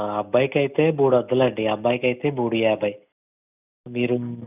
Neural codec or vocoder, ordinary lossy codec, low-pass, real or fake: none; none; 3.6 kHz; real